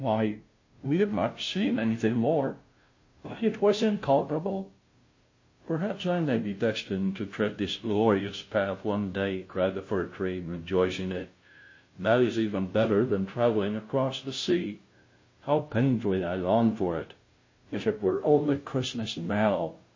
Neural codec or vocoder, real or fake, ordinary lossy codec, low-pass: codec, 16 kHz, 0.5 kbps, FunCodec, trained on Chinese and English, 25 frames a second; fake; MP3, 32 kbps; 7.2 kHz